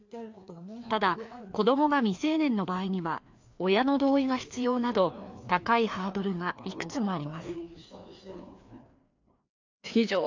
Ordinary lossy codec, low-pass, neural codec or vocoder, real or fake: AAC, 48 kbps; 7.2 kHz; codec, 16 kHz, 2 kbps, FreqCodec, larger model; fake